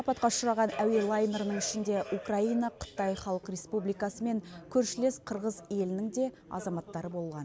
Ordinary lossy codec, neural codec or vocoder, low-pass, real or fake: none; none; none; real